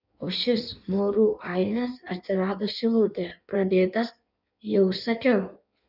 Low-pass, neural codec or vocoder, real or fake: 5.4 kHz; codec, 16 kHz in and 24 kHz out, 1.1 kbps, FireRedTTS-2 codec; fake